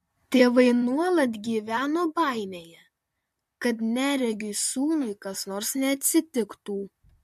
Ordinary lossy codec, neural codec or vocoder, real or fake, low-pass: MP3, 64 kbps; vocoder, 44.1 kHz, 128 mel bands, Pupu-Vocoder; fake; 14.4 kHz